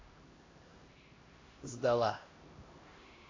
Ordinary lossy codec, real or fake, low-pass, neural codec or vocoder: AAC, 32 kbps; fake; 7.2 kHz; codec, 16 kHz, 1 kbps, X-Codec, HuBERT features, trained on LibriSpeech